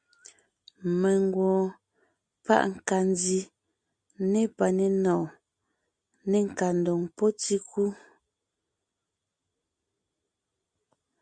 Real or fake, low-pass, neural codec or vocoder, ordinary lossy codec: real; 9.9 kHz; none; Opus, 64 kbps